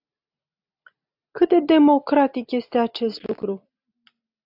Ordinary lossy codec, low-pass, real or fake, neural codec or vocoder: AAC, 48 kbps; 5.4 kHz; real; none